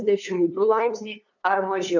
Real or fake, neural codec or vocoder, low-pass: fake; codec, 16 kHz, 2 kbps, FunCodec, trained on LibriTTS, 25 frames a second; 7.2 kHz